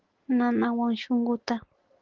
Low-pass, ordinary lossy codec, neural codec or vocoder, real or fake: 7.2 kHz; Opus, 16 kbps; none; real